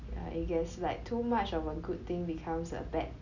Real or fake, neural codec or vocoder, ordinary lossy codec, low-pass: real; none; none; 7.2 kHz